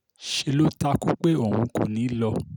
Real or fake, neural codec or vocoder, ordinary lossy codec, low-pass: real; none; none; 19.8 kHz